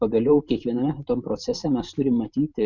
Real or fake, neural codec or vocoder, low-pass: fake; vocoder, 44.1 kHz, 128 mel bands every 512 samples, BigVGAN v2; 7.2 kHz